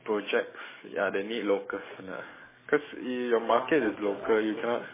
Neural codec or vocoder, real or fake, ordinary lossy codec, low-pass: codec, 44.1 kHz, 7.8 kbps, DAC; fake; MP3, 16 kbps; 3.6 kHz